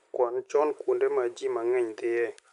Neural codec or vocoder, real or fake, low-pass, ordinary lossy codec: none; real; 10.8 kHz; none